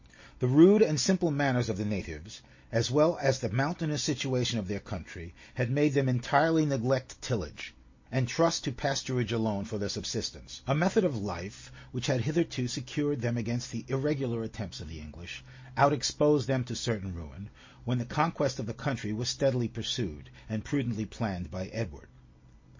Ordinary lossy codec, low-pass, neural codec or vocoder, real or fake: MP3, 32 kbps; 7.2 kHz; none; real